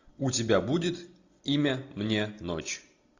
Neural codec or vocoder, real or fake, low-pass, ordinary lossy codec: none; real; 7.2 kHz; AAC, 48 kbps